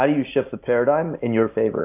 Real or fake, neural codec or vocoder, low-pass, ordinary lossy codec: real; none; 3.6 kHz; AAC, 32 kbps